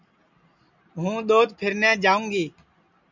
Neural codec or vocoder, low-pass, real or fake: none; 7.2 kHz; real